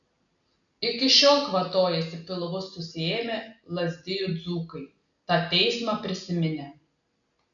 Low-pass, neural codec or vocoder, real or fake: 7.2 kHz; none; real